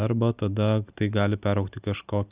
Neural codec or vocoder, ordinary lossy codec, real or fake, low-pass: none; Opus, 64 kbps; real; 3.6 kHz